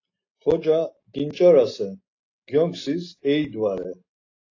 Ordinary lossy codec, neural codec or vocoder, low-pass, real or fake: AAC, 32 kbps; none; 7.2 kHz; real